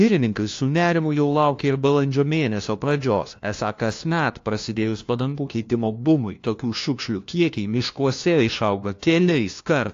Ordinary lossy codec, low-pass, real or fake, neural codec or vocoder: AAC, 48 kbps; 7.2 kHz; fake; codec, 16 kHz, 1 kbps, FunCodec, trained on LibriTTS, 50 frames a second